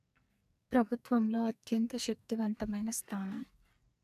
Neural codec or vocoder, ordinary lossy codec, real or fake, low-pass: codec, 44.1 kHz, 2.6 kbps, SNAC; none; fake; 14.4 kHz